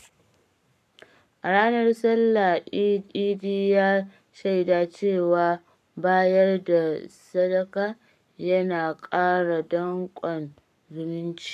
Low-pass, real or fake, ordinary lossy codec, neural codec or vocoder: 14.4 kHz; fake; none; codec, 44.1 kHz, 7.8 kbps, Pupu-Codec